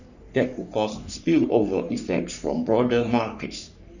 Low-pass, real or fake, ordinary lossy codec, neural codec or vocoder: 7.2 kHz; fake; none; codec, 44.1 kHz, 3.4 kbps, Pupu-Codec